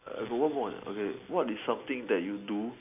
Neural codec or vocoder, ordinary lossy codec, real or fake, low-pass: none; AAC, 32 kbps; real; 3.6 kHz